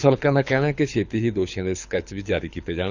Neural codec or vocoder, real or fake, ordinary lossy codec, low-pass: codec, 24 kHz, 6 kbps, HILCodec; fake; none; 7.2 kHz